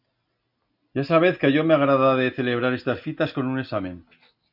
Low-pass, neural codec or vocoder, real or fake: 5.4 kHz; none; real